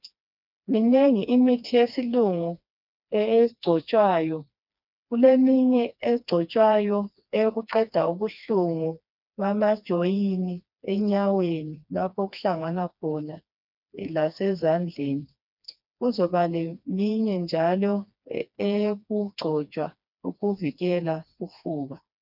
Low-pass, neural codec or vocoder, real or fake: 5.4 kHz; codec, 16 kHz, 2 kbps, FreqCodec, smaller model; fake